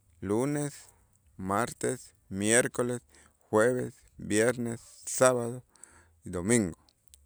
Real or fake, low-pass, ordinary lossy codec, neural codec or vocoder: real; none; none; none